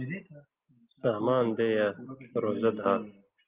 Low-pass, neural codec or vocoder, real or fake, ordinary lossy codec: 3.6 kHz; none; real; Opus, 24 kbps